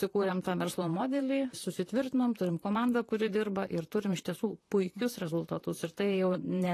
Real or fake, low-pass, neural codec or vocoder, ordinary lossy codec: fake; 14.4 kHz; vocoder, 44.1 kHz, 128 mel bands, Pupu-Vocoder; AAC, 48 kbps